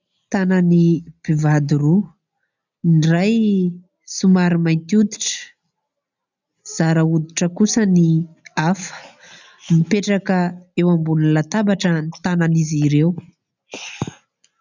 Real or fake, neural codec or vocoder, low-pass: real; none; 7.2 kHz